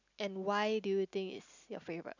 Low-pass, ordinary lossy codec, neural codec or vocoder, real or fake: 7.2 kHz; none; none; real